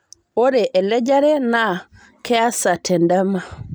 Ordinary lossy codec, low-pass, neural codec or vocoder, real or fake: none; none; none; real